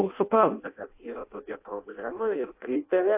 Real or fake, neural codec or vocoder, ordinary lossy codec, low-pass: fake; codec, 16 kHz in and 24 kHz out, 0.6 kbps, FireRedTTS-2 codec; AAC, 24 kbps; 3.6 kHz